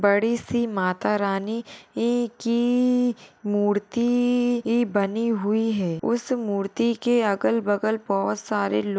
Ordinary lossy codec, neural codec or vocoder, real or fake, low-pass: none; none; real; none